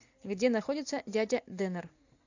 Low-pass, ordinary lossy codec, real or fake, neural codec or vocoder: 7.2 kHz; AAC, 48 kbps; real; none